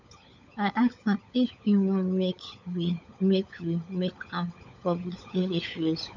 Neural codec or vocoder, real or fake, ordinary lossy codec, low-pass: codec, 16 kHz, 16 kbps, FunCodec, trained on LibriTTS, 50 frames a second; fake; none; 7.2 kHz